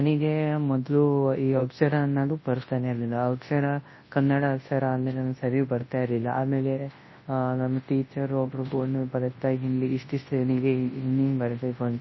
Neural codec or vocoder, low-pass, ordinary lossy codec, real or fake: codec, 24 kHz, 0.9 kbps, WavTokenizer, large speech release; 7.2 kHz; MP3, 24 kbps; fake